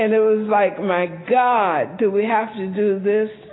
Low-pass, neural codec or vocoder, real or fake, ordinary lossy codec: 7.2 kHz; none; real; AAC, 16 kbps